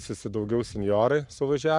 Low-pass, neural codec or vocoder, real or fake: 10.8 kHz; codec, 44.1 kHz, 7.8 kbps, Pupu-Codec; fake